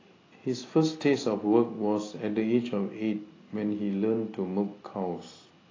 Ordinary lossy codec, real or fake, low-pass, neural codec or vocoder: AAC, 32 kbps; real; 7.2 kHz; none